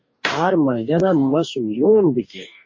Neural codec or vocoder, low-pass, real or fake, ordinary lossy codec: codec, 44.1 kHz, 2.6 kbps, DAC; 7.2 kHz; fake; MP3, 32 kbps